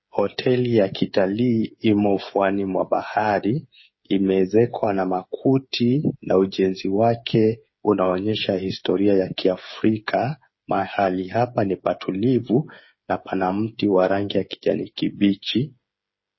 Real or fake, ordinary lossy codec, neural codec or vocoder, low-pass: fake; MP3, 24 kbps; codec, 16 kHz, 8 kbps, FreqCodec, smaller model; 7.2 kHz